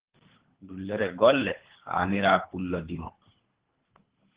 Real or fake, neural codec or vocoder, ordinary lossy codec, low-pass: fake; codec, 24 kHz, 3 kbps, HILCodec; Opus, 16 kbps; 3.6 kHz